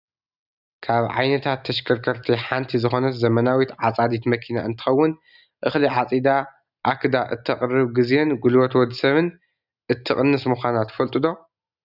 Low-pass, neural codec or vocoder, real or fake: 5.4 kHz; none; real